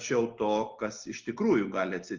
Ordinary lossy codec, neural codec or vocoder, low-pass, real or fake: Opus, 32 kbps; none; 7.2 kHz; real